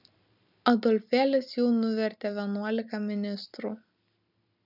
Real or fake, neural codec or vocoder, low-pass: real; none; 5.4 kHz